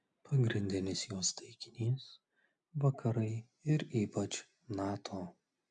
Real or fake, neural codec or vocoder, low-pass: real; none; 9.9 kHz